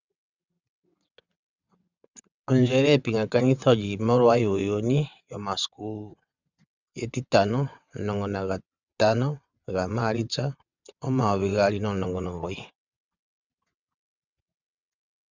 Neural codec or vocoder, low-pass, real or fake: vocoder, 22.05 kHz, 80 mel bands, WaveNeXt; 7.2 kHz; fake